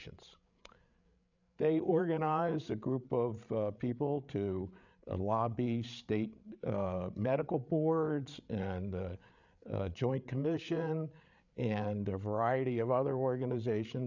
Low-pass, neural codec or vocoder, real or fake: 7.2 kHz; codec, 16 kHz, 8 kbps, FreqCodec, larger model; fake